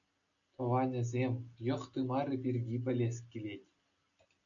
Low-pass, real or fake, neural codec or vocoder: 7.2 kHz; real; none